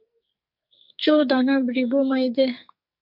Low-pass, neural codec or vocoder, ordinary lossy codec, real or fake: 5.4 kHz; codec, 44.1 kHz, 2.6 kbps, SNAC; MP3, 48 kbps; fake